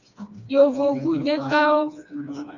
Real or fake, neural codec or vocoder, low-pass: fake; codec, 16 kHz, 2 kbps, FreqCodec, smaller model; 7.2 kHz